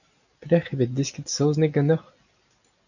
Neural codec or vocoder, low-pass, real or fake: none; 7.2 kHz; real